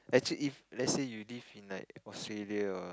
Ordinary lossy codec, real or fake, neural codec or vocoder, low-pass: none; real; none; none